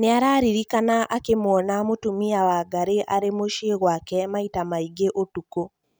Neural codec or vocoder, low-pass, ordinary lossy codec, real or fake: none; none; none; real